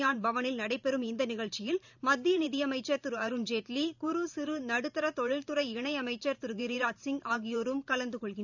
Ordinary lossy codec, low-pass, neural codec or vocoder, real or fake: none; 7.2 kHz; vocoder, 44.1 kHz, 128 mel bands every 512 samples, BigVGAN v2; fake